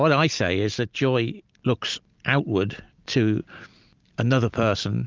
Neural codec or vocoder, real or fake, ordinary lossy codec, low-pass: none; real; Opus, 24 kbps; 7.2 kHz